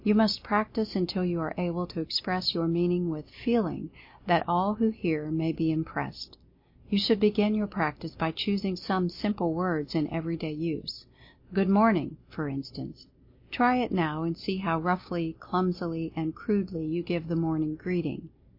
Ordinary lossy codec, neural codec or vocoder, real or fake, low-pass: MP3, 32 kbps; none; real; 5.4 kHz